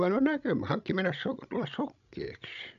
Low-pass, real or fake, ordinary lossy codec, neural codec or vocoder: 7.2 kHz; fake; none; codec, 16 kHz, 16 kbps, FreqCodec, larger model